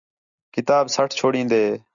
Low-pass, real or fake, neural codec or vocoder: 7.2 kHz; real; none